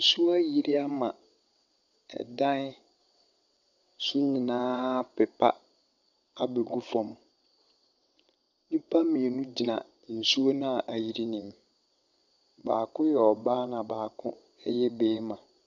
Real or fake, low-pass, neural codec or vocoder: fake; 7.2 kHz; vocoder, 22.05 kHz, 80 mel bands, WaveNeXt